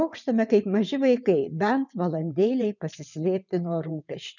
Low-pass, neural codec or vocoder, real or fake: 7.2 kHz; vocoder, 22.05 kHz, 80 mel bands, WaveNeXt; fake